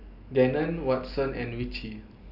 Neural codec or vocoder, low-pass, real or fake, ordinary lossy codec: none; 5.4 kHz; real; none